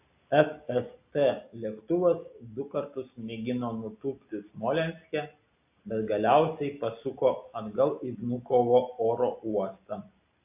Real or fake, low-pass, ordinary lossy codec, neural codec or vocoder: real; 3.6 kHz; AAC, 32 kbps; none